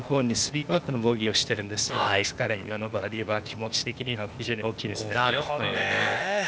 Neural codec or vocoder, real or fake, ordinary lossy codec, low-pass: codec, 16 kHz, 0.8 kbps, ZipCodec; fake; none; none